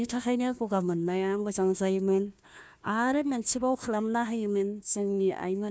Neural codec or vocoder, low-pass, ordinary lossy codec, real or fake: codec, 16 kHz, 1 kbps, FunCodec, trained on Chinese and English, 50 frames a second; none; none; fake